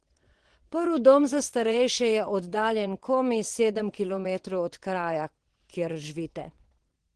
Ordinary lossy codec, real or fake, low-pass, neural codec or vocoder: Opus, 16 kbps; fake; 9.9 kHz; vocoder, 22.05 kHz, 80 mel bands, WaveNeXt